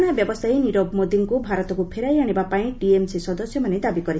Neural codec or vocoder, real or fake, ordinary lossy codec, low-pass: none; real; none; none